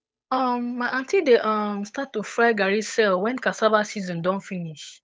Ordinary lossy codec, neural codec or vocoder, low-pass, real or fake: none; codec, 16 kHz, 8 kbps, FunCodec, trained on Chinese and English, 25 frames a second; none; fake